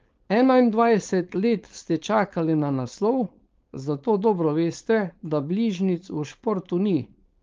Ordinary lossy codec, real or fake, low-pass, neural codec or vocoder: Opus, 24 kbps; fake; 7.2 kHz; codec, 16 kHz, 4.8 kbps, FACodec